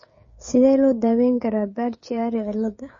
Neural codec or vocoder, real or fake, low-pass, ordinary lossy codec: codec, 16 kHz, 16 kbps, FreqCodec, smaller model; fake; 7.2 kHz; MP3, 32 kbps